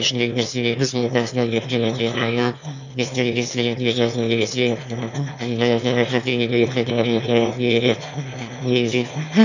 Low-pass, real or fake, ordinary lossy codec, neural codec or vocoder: 7.2 kHz; fake; none; autoencoder, 22.05 kHz, a latent of 192 numbers a frame, VITS, trained on one speaker